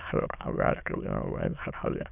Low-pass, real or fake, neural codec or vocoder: 3.6 kHz; fake; autoencoder, 22.05 kHz, a latent of 192 numbers a frame, VITS, trained on many speakers